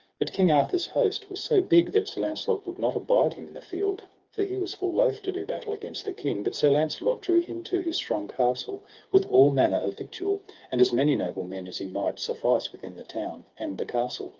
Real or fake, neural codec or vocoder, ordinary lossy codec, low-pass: fake; codec, 16 kHz, 4 kbps, FreqCodec, smaller model; Opus, 24 kbps; 7.2 kHz